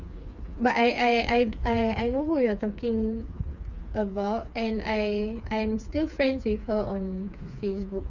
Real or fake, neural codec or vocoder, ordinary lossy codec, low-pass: fake; codec, 16 kHz, 4 kbps, FreqCodec, smaller model; none; 7.2 kHz